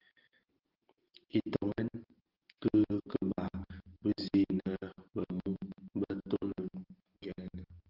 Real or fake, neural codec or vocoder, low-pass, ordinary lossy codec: real; none; 5.4 kHz; Opus, 16 kbps